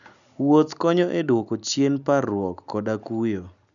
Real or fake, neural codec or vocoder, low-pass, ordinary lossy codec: real; none; 7.2 kHz; none